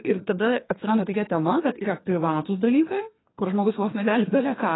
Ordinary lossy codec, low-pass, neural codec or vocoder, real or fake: AAC, 16 kbps; 7.2 kHz; codec, 32 kHz, 1.9 kbps, SNAC; fake